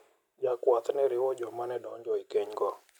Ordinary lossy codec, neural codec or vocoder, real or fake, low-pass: none; none; real; 19.8 kHz